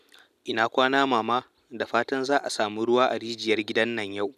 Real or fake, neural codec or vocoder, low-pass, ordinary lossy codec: real; none; 14.4 kHz; none